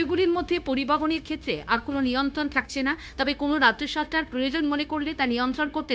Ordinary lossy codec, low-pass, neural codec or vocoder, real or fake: none; none; codec, 16 kHz, 0.9 kbps, LongCat-Audio-Codec; fake